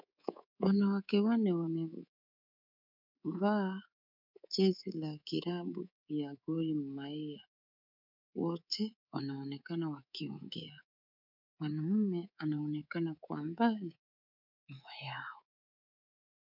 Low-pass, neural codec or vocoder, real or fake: 5.4 kHz; codec, 24 kHz, 3.1 kbps, DualCodec; fake